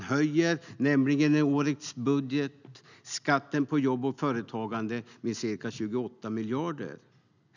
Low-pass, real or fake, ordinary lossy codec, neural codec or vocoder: 7.2 kHz; real; none; none